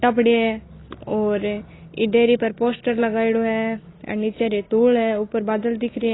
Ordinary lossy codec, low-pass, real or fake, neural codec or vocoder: AAC, 16 kbps; 7.2 kHz; real; none